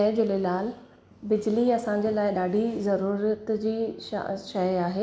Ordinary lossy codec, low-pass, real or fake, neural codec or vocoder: none; none; real; none